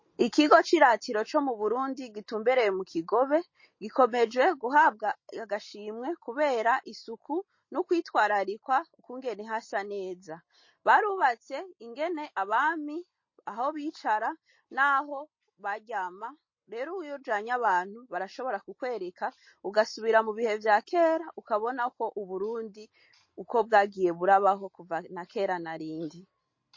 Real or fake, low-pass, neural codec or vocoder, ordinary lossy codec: real; 7.2 kHz; none; MP3, 32 kbps